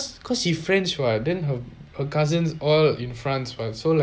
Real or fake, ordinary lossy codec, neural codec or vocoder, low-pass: real; none; none; none